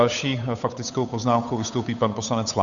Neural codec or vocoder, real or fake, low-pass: codec, 16 kHz, 8 kbps, FunCodec, trained on Chinese and English, 25 frames a second; fake; 7.2 kHz